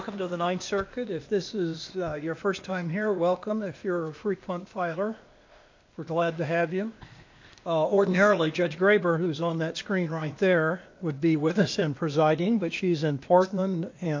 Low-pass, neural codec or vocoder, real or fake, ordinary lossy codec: 7.2 kHz; codec, 16 kHz, 0.8 kbps, ZipCodec; fake; MP3, 48 kbps